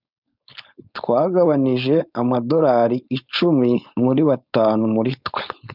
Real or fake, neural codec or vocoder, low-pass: fake; codec, 16 kHz, 4.8 kbps, FACodec; 5.4 kHz